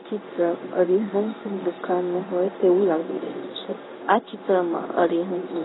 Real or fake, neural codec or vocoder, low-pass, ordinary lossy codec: fake; codec, 16 kHz in and 24 kHz out, 1 kbps, XY-Tokenizer; 7.2 kHz; AAC, 16 kbps